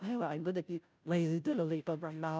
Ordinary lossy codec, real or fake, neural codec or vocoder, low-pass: none; fake; codec, 16 kHz, 0.5 kbps, FunCodec, trained on Chinese and English, 25 frames a second; none